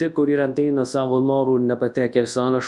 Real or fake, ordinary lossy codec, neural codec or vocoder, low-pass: fake; Opus, 64 kbps; codec, 24 kHz, 0.9 kbps, WavTokenizer, large speech release; 10.8 kHz